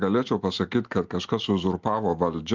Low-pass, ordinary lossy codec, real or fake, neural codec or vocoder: 7.2 kHz; Opus, 24 kbps; real; none